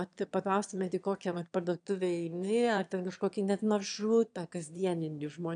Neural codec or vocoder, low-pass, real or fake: autoencoder, 22.05 kHz, a latent of 192 numbers a frame, VITS, trained on one speaker; 9.9 kHz; fake